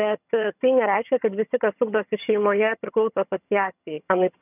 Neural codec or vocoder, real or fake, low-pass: vocoder, 22.05 kHz, 80 mel bands, HiFi-GAN; fake; 3.6 kHz